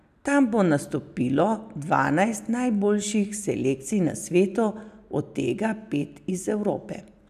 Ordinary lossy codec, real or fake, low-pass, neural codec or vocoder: none; real; 14.4 kHz; none